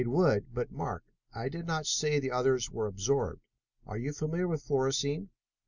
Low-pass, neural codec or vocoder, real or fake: 7.2 kHz; none; real